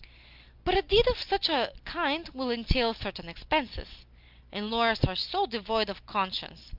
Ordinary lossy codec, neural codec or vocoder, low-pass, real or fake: Opus, 24 kbps; none; 5.4 kHz; real